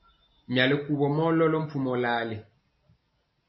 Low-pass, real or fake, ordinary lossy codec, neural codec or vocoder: 7.2 kHz; real; MP3, 24 kbps; none